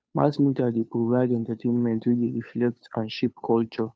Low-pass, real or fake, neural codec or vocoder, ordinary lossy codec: none; fake; codec, 16 kHz, 2 kbps, FunCodec, trained on Chinese and English, 25 frames a second; none